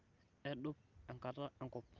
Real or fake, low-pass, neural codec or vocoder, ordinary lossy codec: real; 7.2 kHz; none; Opus, 32 kbps